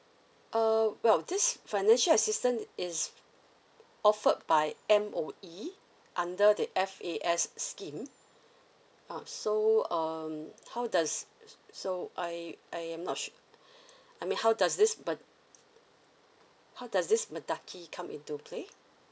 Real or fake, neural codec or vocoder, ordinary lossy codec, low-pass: real; none; none; none